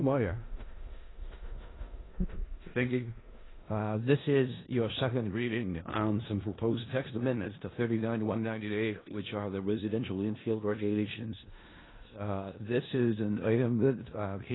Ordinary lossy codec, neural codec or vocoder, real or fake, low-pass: AAC, 16 kbps; codec, 16 kHz in and 24 kHz out, 0.4 kbps, LongCat-Audio-Codec, four codebook decoder; fake; 7.2 kHz